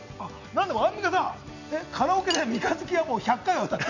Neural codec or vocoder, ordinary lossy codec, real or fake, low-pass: none; none; real; 7.2 kHz